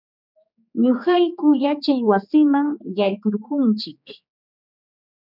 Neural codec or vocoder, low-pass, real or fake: codec, 16 kHz, 4 kbps, X-Codec, HuBERT features, trained on general audio; 5.4 kHz; fake